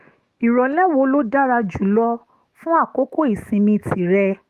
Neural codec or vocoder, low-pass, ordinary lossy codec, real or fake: autoencoder, 48 kHz, 128 numbers a frame, DAC-VAE, trained on Japanese speech; 14.4 kHz; Opus, 24 kbps; fake